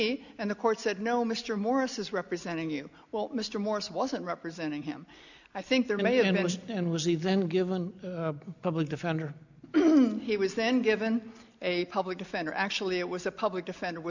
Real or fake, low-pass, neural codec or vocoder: real; 7.2 kHz; none